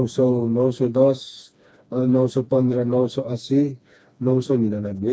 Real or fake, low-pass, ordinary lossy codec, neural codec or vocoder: fake; none; none; codec, 16 kHz, 2 kbps, FreqCodec, smaller model